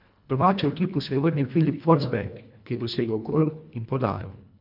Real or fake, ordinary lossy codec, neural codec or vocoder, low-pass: fake; MP3, 48 kbps; codec, 24 kHz, 1.5 kbps, HILCodec; 5.4 kHz